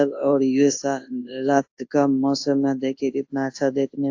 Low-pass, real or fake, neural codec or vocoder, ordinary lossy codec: 7.2 kHz; fake; codec, 24 kHz, 0.9 kbps, WavTokenizer, large speech release; AAC, 48 kbps